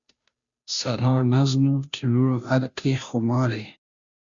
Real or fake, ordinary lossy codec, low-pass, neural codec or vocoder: fake; Opus, 64 kbps; 7.2 kHz; codec, 16 kHz, 0.5 kbps, FunCodec, trained on Chinese and English, 25 frames a second